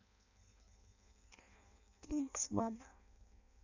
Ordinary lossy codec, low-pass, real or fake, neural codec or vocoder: none; 7.2 kHz; fake; codec, 16 kHz in and 24 kHz out, 0.6 kbps, FireRedTTS-2 codec